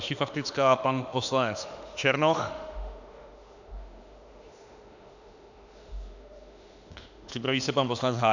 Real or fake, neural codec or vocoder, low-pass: fake; autoencoder, 48 kHz, 32 numbers a frame, DAC-VAE, trained on Japanese speech; 7.2 kHz